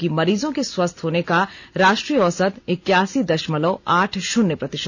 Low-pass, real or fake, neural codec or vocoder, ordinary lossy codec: none; real; none; none